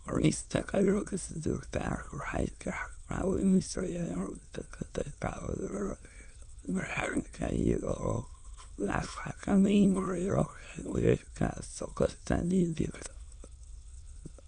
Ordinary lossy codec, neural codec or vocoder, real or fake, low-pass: none; autoencoder, 22.05 kHz, a latent of 192 numbers a frame, VITS, trained on many speakers; fake; 9.9 kHz